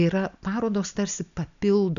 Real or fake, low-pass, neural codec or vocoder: real; 7.2 kHz; none